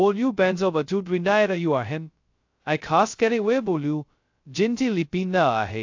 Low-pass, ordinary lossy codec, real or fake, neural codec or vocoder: 7.2 kHz; AAC, 48 kbps; fake; codec, 16 kHz, 0.2 kbps, FocalCodec